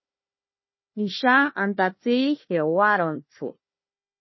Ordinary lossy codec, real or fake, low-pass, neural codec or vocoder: MP3, 24 kbps; fake; 7.2 kHz; codec, 16 kHz, 1 kbps, FunCodec, trained on Chinese and English, 50 frames a second